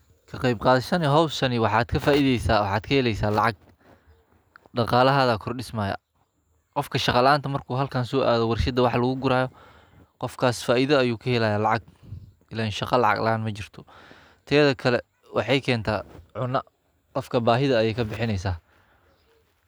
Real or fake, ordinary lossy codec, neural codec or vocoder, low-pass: real; none; none; none